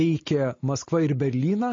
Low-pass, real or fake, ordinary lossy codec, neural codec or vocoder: 7.2 kHz; real; MP3, 32 kbps; none